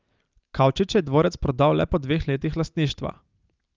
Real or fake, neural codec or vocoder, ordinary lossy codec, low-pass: real; none; Opus, 24 kbps; 7.2 kHz